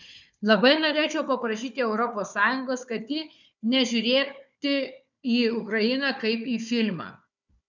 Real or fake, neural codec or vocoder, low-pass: fake; codec, 16 kHz, 4 kbps, FunCodec, trained on Chinese and English, 50 frames a second; 7.2 kHz